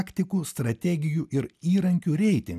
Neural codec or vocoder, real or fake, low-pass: none; real; 14.4 kHz